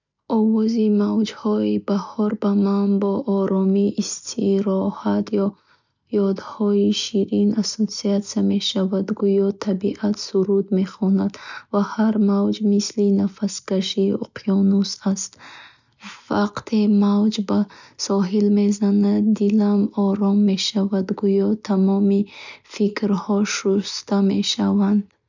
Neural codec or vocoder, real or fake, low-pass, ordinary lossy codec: none; real; 7.2 kHz; none